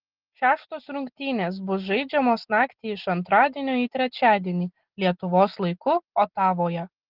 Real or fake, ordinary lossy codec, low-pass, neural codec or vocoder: real; Opus, 16 kbps; 5.4 kHz; none